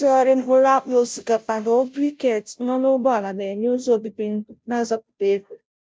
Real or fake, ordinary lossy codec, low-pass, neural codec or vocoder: fake; none; none; codec, 16 kHz, 0.5 kbps, FunCodec, trained on Chinese and English, 25 frames a second